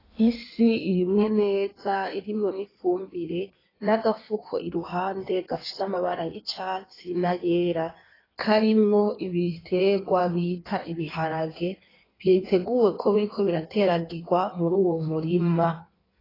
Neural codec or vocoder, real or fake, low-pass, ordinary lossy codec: codec, 16 kHz in and 24 kHz out, 1.1 kbps, FireRedTTS-2 codec; fake; 5.4 kHz; AAC, 24 kbps